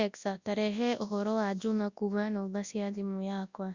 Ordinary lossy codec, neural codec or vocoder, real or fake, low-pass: Opus, 64 kbps; codec, 24 kHz, 0.9 kbps, WavTokenizer, large speech release; fake; 7.2 kHz